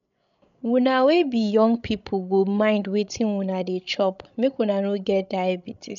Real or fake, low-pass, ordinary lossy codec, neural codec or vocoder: fake; 7.2 kHz; none; codec, 16 kHz, 16 kbps, FreqCodec, larger model